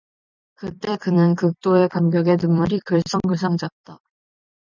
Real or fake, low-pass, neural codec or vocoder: fake; 7.2 kHz; vocoder, 44.1 kHz, 128 mel bands every 256 samples, BigVGAN v2